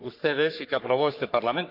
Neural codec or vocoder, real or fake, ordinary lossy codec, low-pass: codec, 44.1 kHz, 3.4 kbps, Pupu-Codec; fake; none; 5.4 kHz